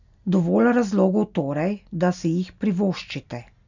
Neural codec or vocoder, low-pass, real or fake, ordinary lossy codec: none; 7.2 kHz; real; none